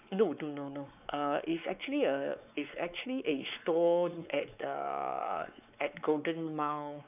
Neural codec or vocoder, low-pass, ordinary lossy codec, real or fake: codec, 16 kHz, 4 kbps, X-Codec, WavLM features, trained on Multilingual LibriSpeech; 3.6 kHz; none; fake